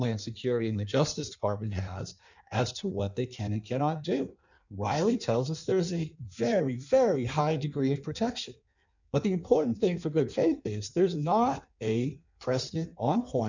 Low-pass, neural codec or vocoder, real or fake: 7.2 kHz; codec, 16 kHz in and 24 kHz out, 1.1 kbps, FireRedTTS-2 codec; fake